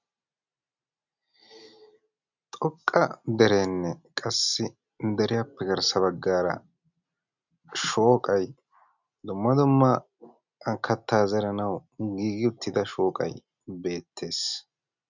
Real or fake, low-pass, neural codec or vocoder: real; 7.2 kHz; none